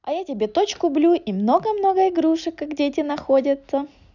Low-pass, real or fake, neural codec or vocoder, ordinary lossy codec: 7.2 kHz; real; none; none